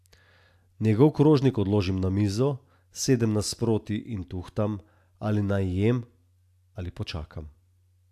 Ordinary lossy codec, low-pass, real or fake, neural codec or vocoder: AAC, 96 kbps; 14.4 kHz; real; none